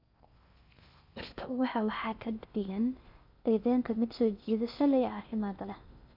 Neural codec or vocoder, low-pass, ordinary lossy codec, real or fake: codec, 16 kHz in and 24 kHz out, 0.6 kbps, FocalCodec, streaming, 4096 codes; 5.4 kHz; none; fake